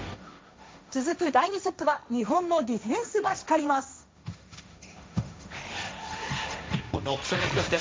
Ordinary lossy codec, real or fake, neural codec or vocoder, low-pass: none; fake; codec, 16 kHz, 1.1 kbps, Voila-Tokenizer; none